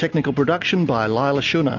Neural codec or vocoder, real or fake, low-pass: none; real; 7.2 kHz